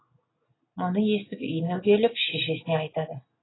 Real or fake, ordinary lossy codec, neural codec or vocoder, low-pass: real; AAC, 16 kbps; none; 7.2 kHz